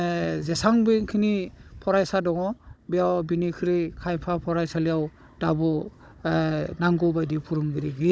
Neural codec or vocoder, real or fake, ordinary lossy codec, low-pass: codec, 16 kHz, 16 kbps, FunCodec, trained on Chinese and English, 50 frames a second; fake; none; none